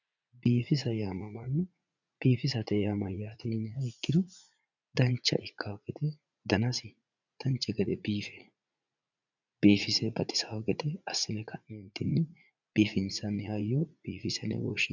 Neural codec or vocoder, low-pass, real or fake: vocoder, 44.1 kHz, 80 mel bands, Vocos; 7.2 kHz; fake